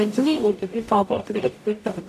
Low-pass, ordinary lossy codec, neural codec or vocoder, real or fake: 14.4 kHz; none; codec, 44.1 kHz, 0.9 kbps, DAC; fake